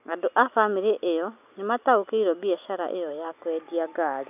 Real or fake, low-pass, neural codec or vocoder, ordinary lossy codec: real; 3.6 kHz; none; none